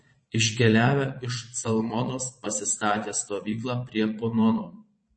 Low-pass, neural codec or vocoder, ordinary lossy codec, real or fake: 9.9 kHz; vocoder, 22.05 kHz, 80 mel bands, Vocos; MP3, 32 kbps; fake